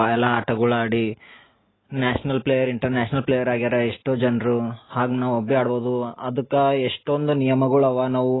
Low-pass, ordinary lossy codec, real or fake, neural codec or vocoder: 7.2 kHz; AAC, 16 kbps; real; none